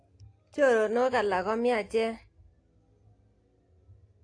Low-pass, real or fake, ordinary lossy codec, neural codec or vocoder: 9.9 kHz; real; AAC, 48 kbps; none